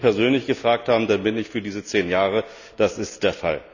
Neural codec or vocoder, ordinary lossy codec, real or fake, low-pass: none; none; real; 7.2 kHz